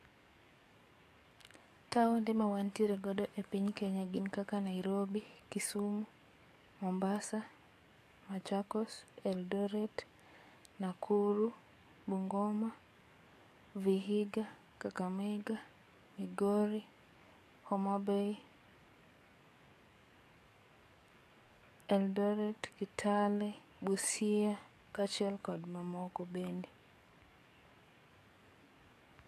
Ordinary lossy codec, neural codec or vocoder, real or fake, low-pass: AAC, 64 kbps; codec, 44.1 kHz, 7.8 kbps, DAC; fake; 14.4 kHz